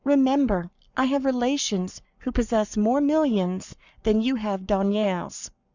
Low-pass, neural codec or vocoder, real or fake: 7.2 kHz; codec, 44.1 kHz, 7.8 kbps, Pupu-Codec; fake